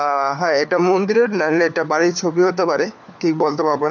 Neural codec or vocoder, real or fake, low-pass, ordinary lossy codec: codec, 16 kHz, 4 kbps, FunCodec, trained on LibriTTS, 50 frames a second; fake; 7.2 kHz; none